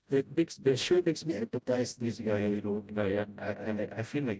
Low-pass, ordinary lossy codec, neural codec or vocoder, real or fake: none; none; codec, 16 kHz, 0.5 kbps, FreqCodec, smaller model; fake